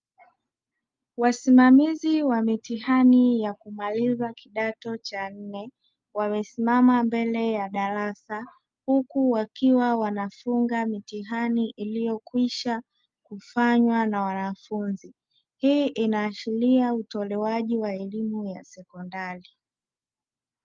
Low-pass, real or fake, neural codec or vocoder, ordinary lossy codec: 7.2 kHz; real; none; Opus, 32 kbps